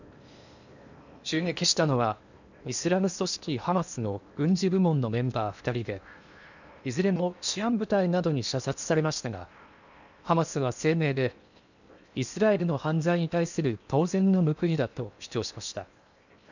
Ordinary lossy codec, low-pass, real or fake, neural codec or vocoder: none; 7.2 kHz; fake; codec, 16 kHz in and 24 kHz out, 0.8 kbps, FocalCodec, streaming, 65536 codes